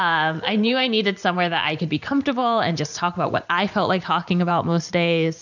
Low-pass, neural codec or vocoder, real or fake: 7.2 kHz; vocoder, 44.1 kHz, 128 mel bands every 512 samples, BigVGAN v2; fake